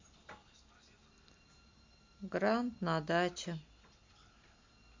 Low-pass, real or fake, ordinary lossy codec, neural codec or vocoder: 7.2 kHz; real; MP3, 48 kbps; none